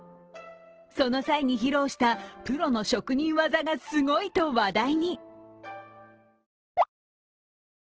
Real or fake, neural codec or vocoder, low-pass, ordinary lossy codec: real; none; 7.2 kHz; Opus, 16 kbps